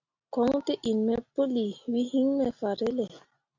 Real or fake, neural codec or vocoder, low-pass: real; none; 7.2 kHz